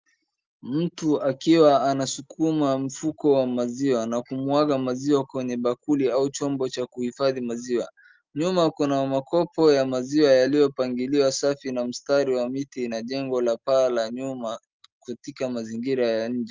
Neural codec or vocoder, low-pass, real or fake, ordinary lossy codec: none; 7.2 kHz; real; Opus, 32 kbps